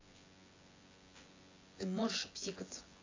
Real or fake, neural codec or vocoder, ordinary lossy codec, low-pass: fake; vocoder, 24 kHz, 100 mel bands, Vocos; AAC, 32 kbps; 7.2 kHz